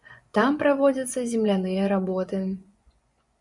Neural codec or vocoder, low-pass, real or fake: vocoder, 24 kHz, 100 mel bands, Vocos; 10.8 kHz; fake